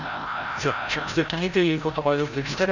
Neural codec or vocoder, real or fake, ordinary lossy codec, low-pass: codec, 16 kHz, 0.5 kbps, FreqCodec, larger model; fake; none; 7.2 kHz